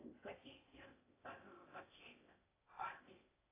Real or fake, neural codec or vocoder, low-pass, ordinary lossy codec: fake; codec, 16 kHz in and 24 kHz out, 0.6 kbps, FocalCodec, streaming, 4096 codes; 3.6 kHz; AAC, 16 kbps